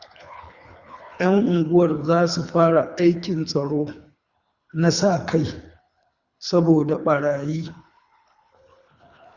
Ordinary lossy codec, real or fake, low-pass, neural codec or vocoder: Opus, 64 kbps; fake; 7.2 kHz; codec, 24 kHz, 3 kbps, HILCodec